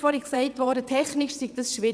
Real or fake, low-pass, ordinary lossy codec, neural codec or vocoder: fake; none; none; vocoder, 22.05 kHz, 80 mel bands, WaveNeXt